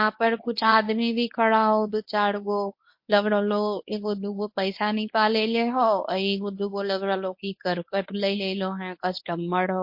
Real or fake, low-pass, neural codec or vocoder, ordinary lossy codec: fake; 5.4 kHz; codec, 24 kHz, 0.9 kbps, WavTokenizer, medium speech release version 1; MP3, 32 kbps